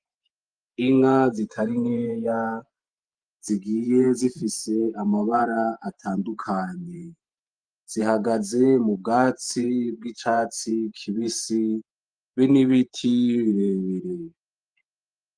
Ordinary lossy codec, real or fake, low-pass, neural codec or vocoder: Opus, 32 kbps; fake; 9.9 kHz; vocoder, 48 kHz, 128 mel bands, Vocos